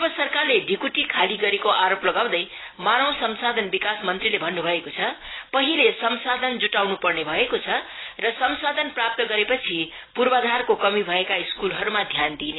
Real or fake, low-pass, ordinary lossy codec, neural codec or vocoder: real; 7.2 kHz; AAC, 16 kbps; none